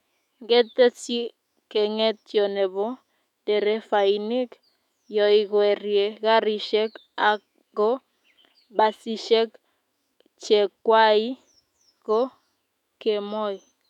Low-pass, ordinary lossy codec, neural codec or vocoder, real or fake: 19.8 kHz; none; autoencoder, 48 kHz, 128 numbers a frame, DAC-VAE, trained on Japanese speech; fake